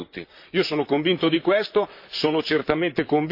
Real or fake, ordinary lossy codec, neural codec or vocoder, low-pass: fake; MP3, 32 kbps; vocoder, 44.1 kHz, 128 mel bands, Pupu-Vocoder; 5.4 kHz